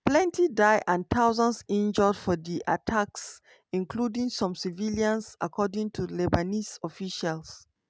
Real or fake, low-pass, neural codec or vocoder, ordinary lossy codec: real; none; none; none